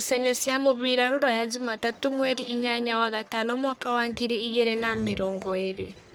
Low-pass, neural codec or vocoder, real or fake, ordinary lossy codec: none; codec, 44.1 kHz, 1.7 kbps, Pupu-Codec; fake; none